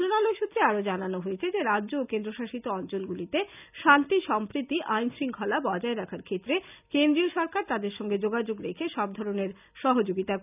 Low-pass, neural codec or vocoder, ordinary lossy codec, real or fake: 3.6 kHz; none; none; real